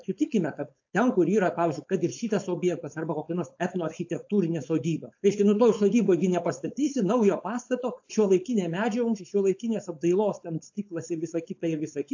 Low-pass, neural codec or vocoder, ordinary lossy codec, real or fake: 7.2 kHz; codec, 16 kHz, 4.8 kbps, FACodec; AAC, 48 kbps; fake